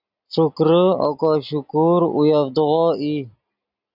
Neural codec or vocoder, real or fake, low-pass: none; real; 5.4 kHz